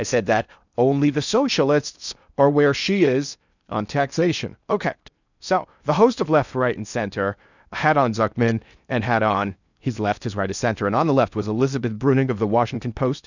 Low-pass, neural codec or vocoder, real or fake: 7.2 kHz; codec, 16 kHz in and 24 kHz out, 0.6 kbps, FocalCodec, streaming, 4096 codes; fake